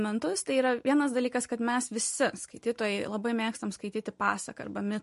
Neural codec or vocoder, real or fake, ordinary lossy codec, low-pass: none; real; MP3, 48 kbps; 14.4 kHz